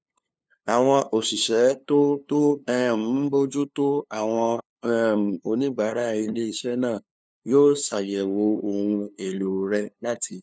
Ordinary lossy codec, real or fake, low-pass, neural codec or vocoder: none; fake; none; codec, 16 kHz, 2 kbps, FunCodec, trained on LibriTTS, 25 frames a second